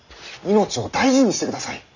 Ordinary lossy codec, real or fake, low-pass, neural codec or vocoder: none; real; 7.2 kHz; none